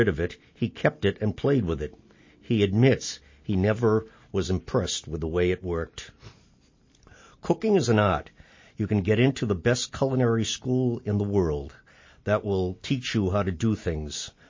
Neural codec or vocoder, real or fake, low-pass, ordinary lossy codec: none; real; 7.2 kHz; MP3, 32 kbps